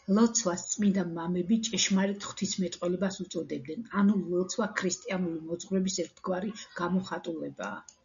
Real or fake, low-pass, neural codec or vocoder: real; 7.2 kHz; none